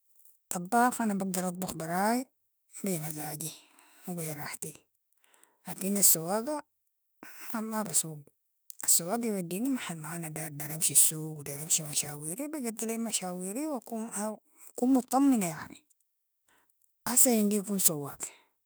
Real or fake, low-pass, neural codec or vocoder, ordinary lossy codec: fake; none; autoencoder, 48 kHz, 32 numbers a frame, DAC-VAE, trained on Japanese speech; none